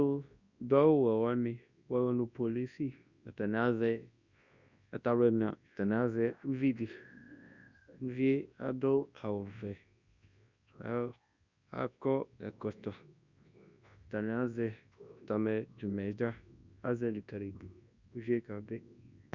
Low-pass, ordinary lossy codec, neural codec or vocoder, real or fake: 7.2 kHz; Opus, 64 kbps; codec, 24 kHz, 0.9 kbps, WavTokenizer, large speech release; fake